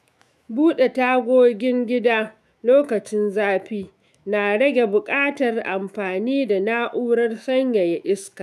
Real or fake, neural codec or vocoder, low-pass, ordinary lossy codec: fake; autoencoder, 48 kHz, 128 numbers a frame, DAC-VAE, trained on Japanese speech; 14.4 kHz; none